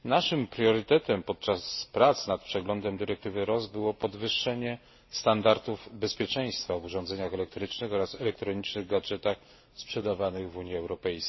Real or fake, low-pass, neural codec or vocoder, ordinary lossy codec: real; 7.2 kHz; none; MP3, 24 kbps